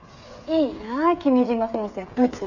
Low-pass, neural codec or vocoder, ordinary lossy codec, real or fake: 7.2 kHz; codec, 16 kHz, 8 kbps, FreqCodec, smaller model; none; fake